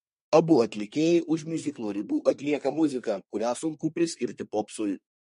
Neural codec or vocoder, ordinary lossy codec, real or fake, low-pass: codec, 24 kHz, 1 kbps, SNAC; MP3, 48 kbps; fake; 10.8 kHz